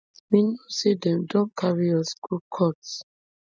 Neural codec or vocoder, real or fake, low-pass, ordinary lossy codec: none; real; none; none